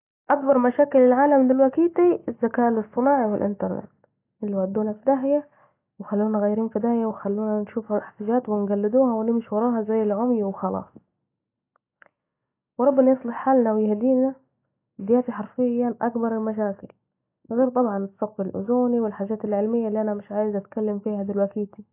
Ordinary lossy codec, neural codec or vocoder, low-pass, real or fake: AAC, 24 kbps; none; 3.6 kHz; real